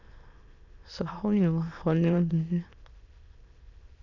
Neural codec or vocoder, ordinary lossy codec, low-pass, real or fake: autoencoder, 22.05 kHz, a latent of 192 numbers a frame, VITS, trained on many speakers; none; 7.2 kHz; fake